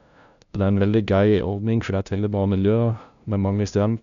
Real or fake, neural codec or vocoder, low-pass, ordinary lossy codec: fake; codec, 16 kHz, 0.5 kbps, FunCodec, trained on LibriTTS, 25 frames a second; 7.2 kHz; none